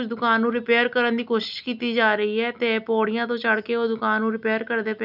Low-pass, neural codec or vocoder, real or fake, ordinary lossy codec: 5.4 kHz; none; real; none